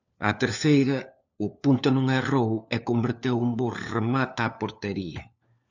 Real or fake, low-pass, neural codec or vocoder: fake; 7.2 kHz; codec, 16 kHz, 4 kbps, FunCodec, trained on LibriTTS, 50 frames a second